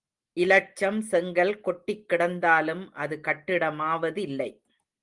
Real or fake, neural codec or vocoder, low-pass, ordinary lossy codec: real; none; 10.8 kHz; Opus, 24 kbps